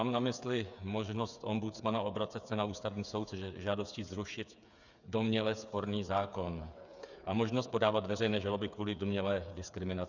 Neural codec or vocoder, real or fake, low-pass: codec, 16 kHz, 8 kbps, FreqCodec, smaller model; fake; 7.2 kHz